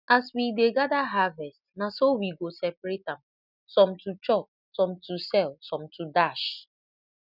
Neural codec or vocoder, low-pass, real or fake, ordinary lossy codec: none; 5.4 kHz; real; none